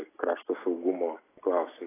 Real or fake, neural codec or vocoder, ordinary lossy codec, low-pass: real; none; AAC, 16 kbps; 3.6 kHz